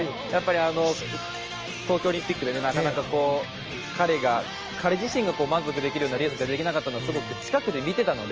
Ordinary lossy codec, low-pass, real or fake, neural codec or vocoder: Opus, 24 kbps; 7.2 kHz; real; none